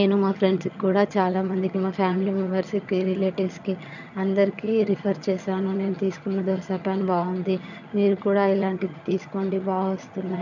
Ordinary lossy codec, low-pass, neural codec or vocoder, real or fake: none; 7.2 kHz; vocoder, 22.05 kHz, 80 mel bands, HiFi-GAN; fake